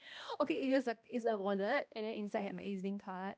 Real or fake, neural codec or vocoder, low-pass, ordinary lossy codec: fake; codec, 16 kHz, 1 kbps, X-Codec, HuBERT features, trained on balanced general audio; none; none